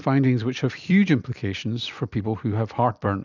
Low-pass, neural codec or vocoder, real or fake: 7.2 kHz; vocoder, 44.1 kHz, 128 mel bands every 256 samples, BigVGAN v2; fake